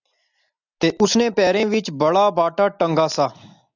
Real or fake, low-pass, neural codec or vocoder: real; 7.2 kHz; none